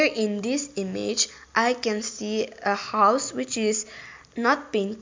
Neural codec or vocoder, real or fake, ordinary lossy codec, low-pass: none; real; MP3, 64 kbps; 7.2 kHz